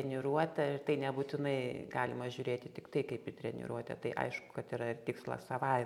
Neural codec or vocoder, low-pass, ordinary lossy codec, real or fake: none; 19.8 kHz; MP3, 96 kbps; real